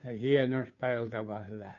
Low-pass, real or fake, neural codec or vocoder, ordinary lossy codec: 7.2 kHz; fake; codec, 16 kHz, 2 kbps, FunCodec, trained on Chinese and English, 25 frames a second; MP3, 48 kbps